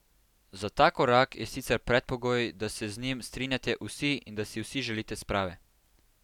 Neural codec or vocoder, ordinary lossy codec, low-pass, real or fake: none; none; 19.8 kHz; real